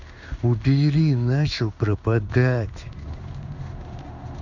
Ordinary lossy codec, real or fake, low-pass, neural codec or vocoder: none; fake; 7.2 kHz; codec, 16 kHz in and 24 kHz out, 1 kbps, XY-Tokenizer